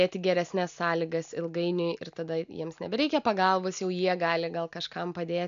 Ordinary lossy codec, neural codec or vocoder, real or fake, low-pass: AAC, 96 kbps; none; real; 7.2 kHz